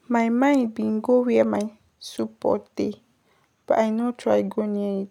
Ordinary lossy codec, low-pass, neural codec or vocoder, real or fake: none; 19.8 kHz; none; real